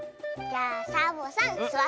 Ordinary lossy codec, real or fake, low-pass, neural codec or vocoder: none; real; none; none